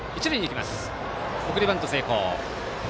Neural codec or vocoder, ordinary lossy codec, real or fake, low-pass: none; none; real; none